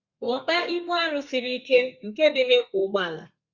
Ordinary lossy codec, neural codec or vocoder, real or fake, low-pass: Opus, 64 kbps; codec, 32 kHz, 1.9 kbps, SNAC; fake; 7.2 kHz